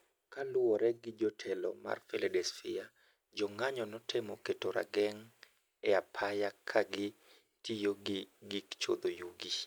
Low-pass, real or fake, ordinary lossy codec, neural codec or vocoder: none; real; none; none